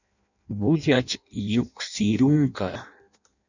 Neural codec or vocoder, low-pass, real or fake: codec, 16 kHz in and 24 kHz out, 0.6 kbps, FireRedTTS-2 codec; 7.2 kHz; fake